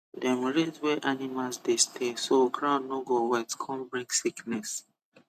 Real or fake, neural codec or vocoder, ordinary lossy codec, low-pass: real; none; none; 14.4 kHz